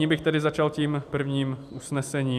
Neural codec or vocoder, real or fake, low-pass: vocoder, 44.1 kHz, 128 mel bands every 256 samples, BigVGAN v2; fake; 14.4 kHz